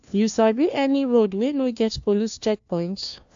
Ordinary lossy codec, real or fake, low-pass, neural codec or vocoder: AAC, 64 kbps; fake; 7.2 kHz; codec, 16 kHz, 1 kbps, FunCodec, trained on LibriTTS, 50 frames a second